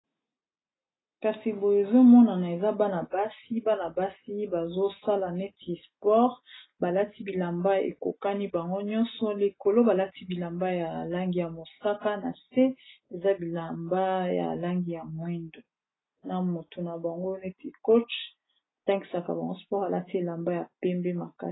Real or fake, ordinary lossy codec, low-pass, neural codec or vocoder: real; AAC, 16 kbps; 7.2 kHz; none